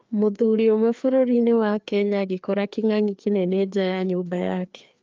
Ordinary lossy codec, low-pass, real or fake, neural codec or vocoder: Opus, 24 kbps; 7.2 kHz; fake; codec, 16 kHz, 2 kbps, FreqCodec, larger model